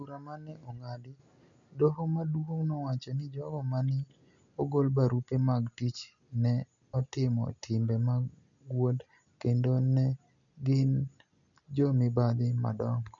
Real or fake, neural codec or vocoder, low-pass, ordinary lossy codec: real; none; 7.2 kHz; none